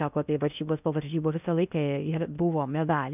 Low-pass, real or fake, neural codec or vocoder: 3.6 kHz; fake; codec, 16 kHz in and 24 kHz out, 0.6 kbps, FocalCodec, streaming, 4096 codes